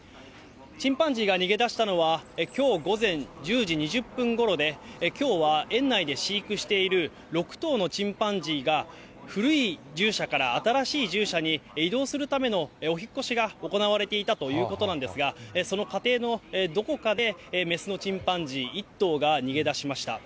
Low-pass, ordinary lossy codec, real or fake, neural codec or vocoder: none; none; real; none